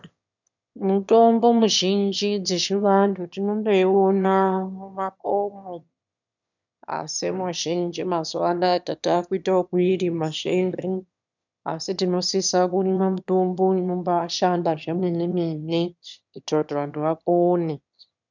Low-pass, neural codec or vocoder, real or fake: 7.2 kHz; autoencoder, 22.05 kHz, a latent of 192 numbers a frame, VITS, trained on one speaker; fake